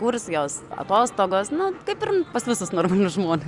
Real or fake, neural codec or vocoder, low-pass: real; none; 10.8 kHz